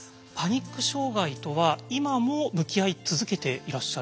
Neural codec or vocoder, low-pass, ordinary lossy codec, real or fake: none; none; none; real